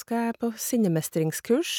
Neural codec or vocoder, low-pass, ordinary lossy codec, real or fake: none; 19.8 kHz; none; real